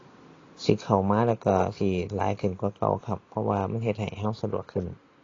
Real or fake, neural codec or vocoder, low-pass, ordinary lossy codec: real; none; 7.2 kHz; AAC, 32 kbps